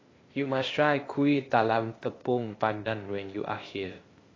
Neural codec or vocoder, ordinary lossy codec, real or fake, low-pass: codec, 16 kHz, 0.8 kbps, ZipCodec; AAC, 32 kbps; fake; 7.2 kHz